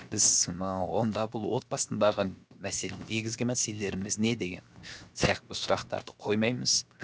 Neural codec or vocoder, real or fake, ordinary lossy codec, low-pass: codec, 16 kHz, 0.7 kbps, FocalCodec; fake; none; none